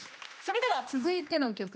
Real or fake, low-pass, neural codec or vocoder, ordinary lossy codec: fake; none; codec, 16 kHz, 1 kbps, X-Codec, HuBERT features, trained on general audio; none